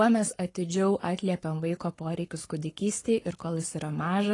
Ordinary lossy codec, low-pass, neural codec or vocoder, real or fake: AAC, 32 kbps; 10.8 kHz; codec, 44.1 kHz, 7.8 kbps, Pupu-Codec; fake